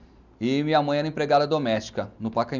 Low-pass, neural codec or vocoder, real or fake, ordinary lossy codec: 7.2 kHz; none; real; none